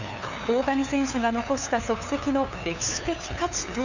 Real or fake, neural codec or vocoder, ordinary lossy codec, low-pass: fake; codec, 16 kHz, 2 kbps, FunCodec, trained on LibriTTS, 25 frames a second; none; 7.2 kHz